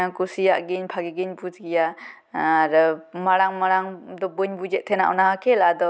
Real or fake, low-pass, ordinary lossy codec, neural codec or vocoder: real; none; none; none